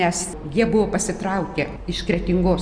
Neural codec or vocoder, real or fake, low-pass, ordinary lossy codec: none; real; 9.9 kHz; Opus, 64 kbps